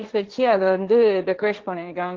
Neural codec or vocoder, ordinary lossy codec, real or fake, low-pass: codec, 16 kHz, 1.1 kbps, Voila-Tokenizer; Opus, 16 kbps; fake; 7.2 kHz